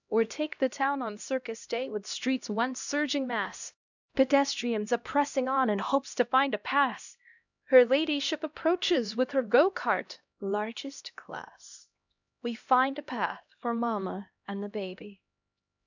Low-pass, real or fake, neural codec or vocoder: 7.2 kHz; fake; codec, 16 kHz, 1 kbps, X-Codec, HuBERT features, trained on LibriSpeech